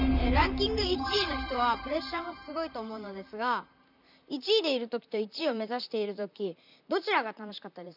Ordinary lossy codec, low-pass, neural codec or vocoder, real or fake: none; 5.4 kHz; vocoder, 44.1 kHz, 128 mel bands, Pupu-Vocoder; fake